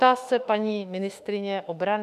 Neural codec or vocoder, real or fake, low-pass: autoencoder, 48 kHz, 32 numbers a frame, DAC-VAE, trained on Japanese speech; fake; 14.4 kHz